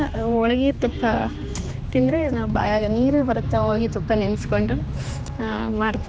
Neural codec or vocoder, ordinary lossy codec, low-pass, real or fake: codec, 16 kHz, 2 kbps, X-Codec, HuBERT features, trained on general audio; none; none; fake